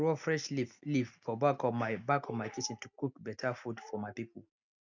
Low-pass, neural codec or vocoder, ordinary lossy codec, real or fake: 7.2 kHz; none; none; real